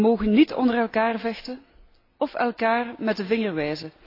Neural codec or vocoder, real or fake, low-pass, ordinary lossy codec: none; real; 5.4 kHz; AAC, 32 kbps